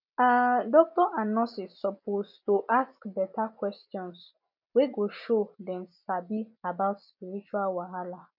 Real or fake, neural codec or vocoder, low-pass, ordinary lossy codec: real; none; 5.4 kHz; none